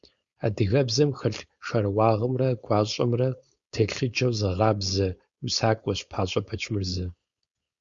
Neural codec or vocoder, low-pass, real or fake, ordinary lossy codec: codec, 16 kHz, 4.8 kbps, FACodec; 7.2 kHz; fake; Opus, 64 kbps